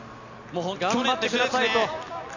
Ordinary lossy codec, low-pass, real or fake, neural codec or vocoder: none; 7.2 kHz; real; none